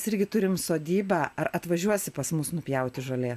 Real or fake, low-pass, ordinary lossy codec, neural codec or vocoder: real; 14.4 kHz; AAC, 96 kbps; none